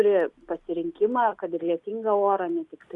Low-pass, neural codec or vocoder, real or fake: 10.8 kHz; none; real